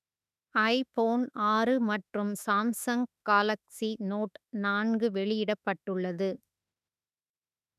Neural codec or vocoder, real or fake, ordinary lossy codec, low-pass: autoencoder, 48 kHz, 32 numbers a frame, DAC-VAE, trained on Japanese speech; fake; none; 14.4 kHz